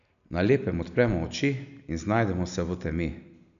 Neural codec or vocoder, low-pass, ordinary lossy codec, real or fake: none; 7.2 kHz; none; real